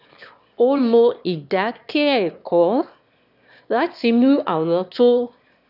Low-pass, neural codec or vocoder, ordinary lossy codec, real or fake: 5.4 kHz; autoencoder, 22.05 kHz, a latent of 192 numbers a frame, VITS, trained on one speaker; none; fake